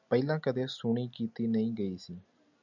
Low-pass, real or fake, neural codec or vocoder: 7.2 kHz; real; none